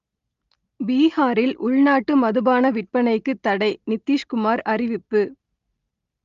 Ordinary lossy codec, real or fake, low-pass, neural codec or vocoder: Opus, 32 kbps; real; 7.2 kHz; none